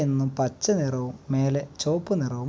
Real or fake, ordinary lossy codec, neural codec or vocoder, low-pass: real; none; none; none